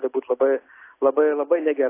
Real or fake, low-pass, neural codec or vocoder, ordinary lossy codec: real; 3.6 kHz; none; AAC, 24 kbps